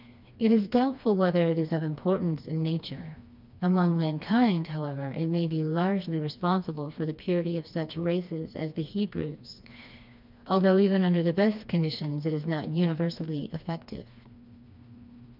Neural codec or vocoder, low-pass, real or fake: codec, 16 kHz, 2 kbps, FreqCodec, smaller model; 5.4 kHz; fake